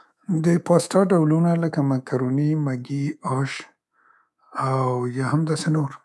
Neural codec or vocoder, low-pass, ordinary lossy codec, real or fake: codec, 24 kHz, 3.1 kbps, DualCodec; none; none; fake